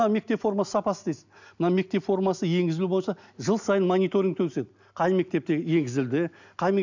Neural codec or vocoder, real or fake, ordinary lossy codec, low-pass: none; real; none; 7.2 kHz